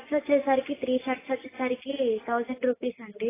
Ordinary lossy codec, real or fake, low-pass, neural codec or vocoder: AAC, 16 kbps; real; 3.6 kHz; none